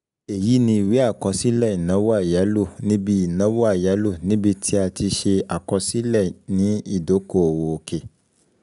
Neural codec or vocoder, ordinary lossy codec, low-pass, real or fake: none; none; 10.8 kHz; real